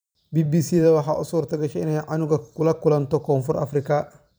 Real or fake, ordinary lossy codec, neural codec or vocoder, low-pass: fake; none; vocoder, 44.1 kHz, 128 mel bands every 512 samples, BigVGAN v2; none